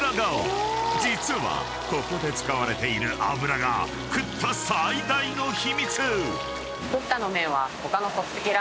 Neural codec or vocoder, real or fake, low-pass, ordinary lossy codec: none; real; none; none